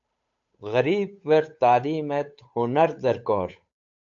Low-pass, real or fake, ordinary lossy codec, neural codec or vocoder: 7.2 kHz; fake; AAC, 64 kbps; codec, 16 kHz, 8 kbps, FunCodec, trained on Chinese and English, 25 frames a second